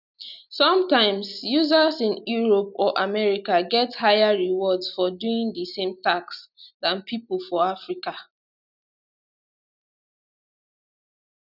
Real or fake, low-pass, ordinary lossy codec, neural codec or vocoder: real; 5.4 kHz; none; none